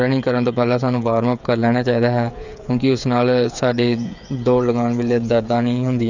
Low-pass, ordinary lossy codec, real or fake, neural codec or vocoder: 7.2 kHz; none; fake; codec, 16 kHz, 8 kbps, FreqCodec, smaller model